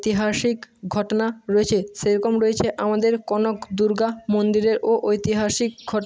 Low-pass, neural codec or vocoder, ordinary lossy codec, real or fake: none; none; none; real